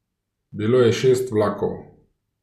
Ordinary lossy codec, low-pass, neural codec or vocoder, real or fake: MP3, 96 kbps; 14.4 kHz; none; real